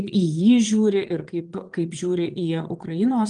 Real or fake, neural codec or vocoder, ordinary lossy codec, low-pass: fake; vocoder, 22.05 kHz, 80 mel bands, Vocos; Opus, 24 kbps; 9.9 kHz